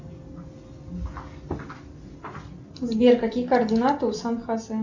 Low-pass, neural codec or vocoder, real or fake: 7.2 kHz; none; real